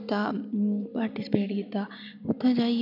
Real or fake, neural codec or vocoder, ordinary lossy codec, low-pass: real; none; none; 5.4 kHz